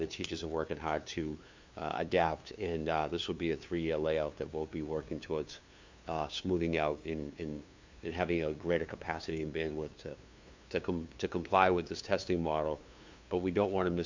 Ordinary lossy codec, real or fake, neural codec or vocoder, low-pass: MP3, 64 kbps; fake; codec, 16 kHz, 2 kbps, FunCodec, trained on LibriTTS, 25 frames a second; 7.2 kHz